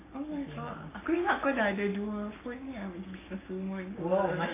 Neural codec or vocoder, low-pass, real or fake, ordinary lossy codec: codec, 44.1 kHz, 7.8 kbps, Pupu-Codec; 3.6 kHz; fake; AAC, 16 kbps